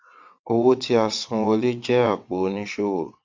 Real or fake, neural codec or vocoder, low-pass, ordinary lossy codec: fake; vocoder, 22.05 kHz, 80 mel bands, WaveNeXt; 7.2 kHz; MP3, 64 kbps